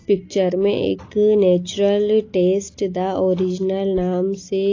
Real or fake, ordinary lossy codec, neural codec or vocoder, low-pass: real; AAC, 32 kbps; none; 7.2 kHz